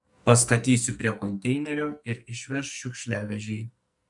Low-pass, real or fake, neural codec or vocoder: 10.8 kHz; fake; codec, 44.1 kHz, 2.6 kbps, SNAC